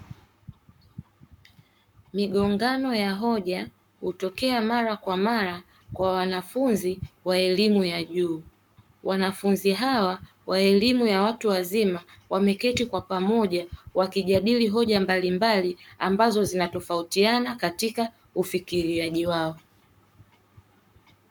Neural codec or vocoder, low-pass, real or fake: codec, 44.1 kHz, 7.8 kbps, Pupu-Codec; 19.8 kHz; fake